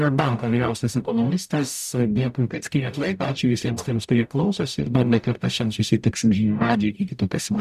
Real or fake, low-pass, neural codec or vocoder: fake; 14.4 kHz; codec, 44.1 kHz, 0.9 kbps, DAC